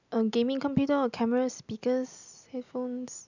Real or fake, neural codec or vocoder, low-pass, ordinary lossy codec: real; none; 7.2 kHz; none